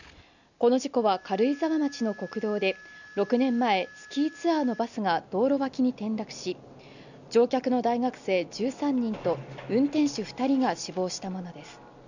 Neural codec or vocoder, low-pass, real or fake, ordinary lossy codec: none; 7.2 kHz; real; none